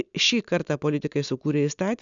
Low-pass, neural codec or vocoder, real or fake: 7.2 kHz; none; real